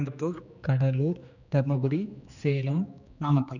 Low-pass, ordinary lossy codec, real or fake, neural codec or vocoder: 7.2 kHz; none; fake; codec, 16 kHz, 2 kbps, X-Codec, HuBERT features, trained on general audio